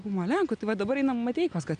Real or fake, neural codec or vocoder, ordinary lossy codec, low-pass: real; none; MP3, 96 kbps; 9.9 kHz